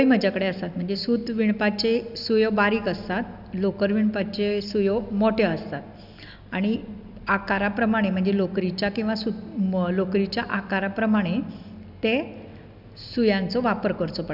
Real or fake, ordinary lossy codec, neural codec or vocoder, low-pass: real; none; none; 5.4 kHz